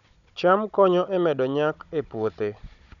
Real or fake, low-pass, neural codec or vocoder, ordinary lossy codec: fake; 7.2 kHz; codec, 16 kHz, 16 kbps, FunCodec, trained on Chinese and English, 50 frames a second; none